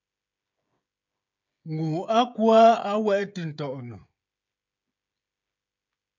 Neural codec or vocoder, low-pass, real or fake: codec, 16 kHz, 16 kbps, FreqCodec, smaller model; 7.2 kHz; fake